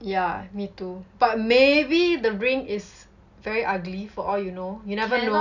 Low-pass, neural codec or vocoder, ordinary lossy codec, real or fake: 7.2 kHz; none; none; real